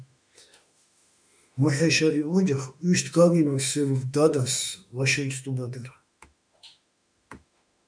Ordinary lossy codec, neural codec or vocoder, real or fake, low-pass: MP3, 96 kbps; autoencoder, 48 kHz, 32 numbers a frame, DAC-VAE, trained on Japanese speech; fake; 9.9 kHz